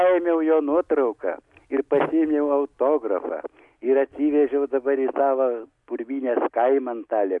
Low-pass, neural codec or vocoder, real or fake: 10.8 kHz; none; real